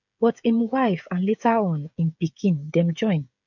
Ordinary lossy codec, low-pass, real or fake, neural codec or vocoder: none; 7.2 kHz; fake; codec, 16 kHz, 16 kbps, FreqCodec, smaller model